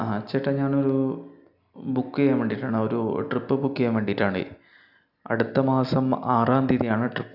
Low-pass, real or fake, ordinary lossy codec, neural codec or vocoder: 5.4 kHz; real; none; none